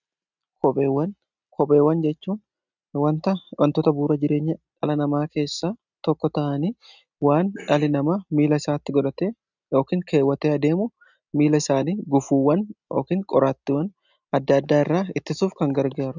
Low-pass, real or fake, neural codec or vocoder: 7.2 kHz; real; none